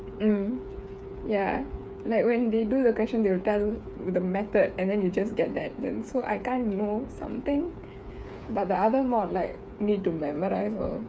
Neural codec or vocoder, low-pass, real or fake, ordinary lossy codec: codec, 16 kHz, 8 kbps, FreqCodec, smaller model; none; fake; none